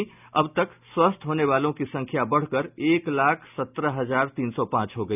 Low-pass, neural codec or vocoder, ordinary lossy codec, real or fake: 3.6 kHz; none; none; real